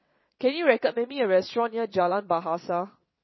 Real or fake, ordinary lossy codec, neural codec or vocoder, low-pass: fake; MP3, 24 kbps; vocoder, 22.05 kHz, 80 mel bands, WaveNeXt; 7.2 kHz